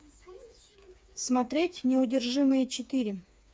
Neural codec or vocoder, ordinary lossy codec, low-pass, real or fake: codec, 16 kHz, 4 kbps, FreqCodec, smaller model; none; none; fake